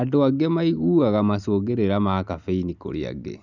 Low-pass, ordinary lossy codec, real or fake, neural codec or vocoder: 7.2 kHz; none; real; none